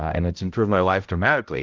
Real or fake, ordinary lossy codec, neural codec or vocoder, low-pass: fake; Opus, 32 kbps; codec, 16 kHz, 0.5 kbps, X-Codec, HuBERT features, trained on balanced general audio; 7.2 kHz